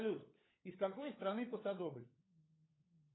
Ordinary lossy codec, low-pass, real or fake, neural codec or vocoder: AAC, 16 kbps; 7.2 kHz; fake; codec, 16 kHz, 4 kbps, FunCodec, trained on LibriTTS, 50 frames a second